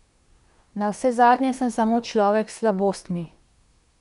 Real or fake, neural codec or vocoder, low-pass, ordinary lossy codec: fake; codec, 24 kHz, 1 kbps, SNAC; 10.8 kHz; none